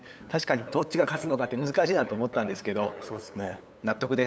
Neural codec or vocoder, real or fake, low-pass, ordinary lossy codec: codec, 16 kHz, 8 kbps, FunCodec, trained on LibriTTS, 25 frames a second; fake; none; none